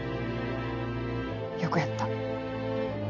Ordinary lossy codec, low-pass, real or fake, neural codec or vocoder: none; 7.2 kHz; real; none